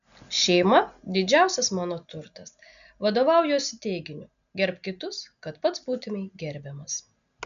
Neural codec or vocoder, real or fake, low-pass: none; real; 7.2 kHz